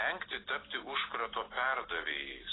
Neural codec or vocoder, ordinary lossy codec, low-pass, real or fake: none; AAC, 16 kbps; 7.2 kHz; real